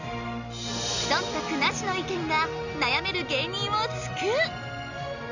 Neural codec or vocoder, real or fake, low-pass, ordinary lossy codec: none; real; 7.2 kHz; none